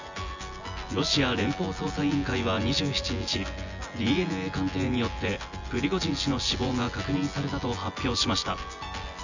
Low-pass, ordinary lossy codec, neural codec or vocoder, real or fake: 7.2 kHz; none; vocoder, 24 kHz, 100 mel bands, Vocos; fake